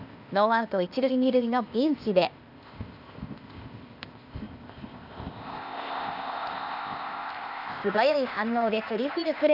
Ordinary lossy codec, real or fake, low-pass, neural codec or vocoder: none; fake; 5.4 kHz; codec, 16 kHz, 0.8 kbps, ZipCodec